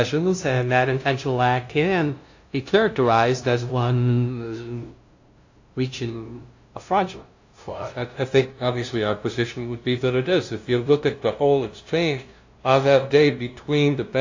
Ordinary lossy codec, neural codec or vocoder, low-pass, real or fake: AAC, 48 kbps; codec, 16 kHz, 0.5 kbps, FunCodec, trained on LibriTTS, 25 frames a second; 7.2 kHz; fake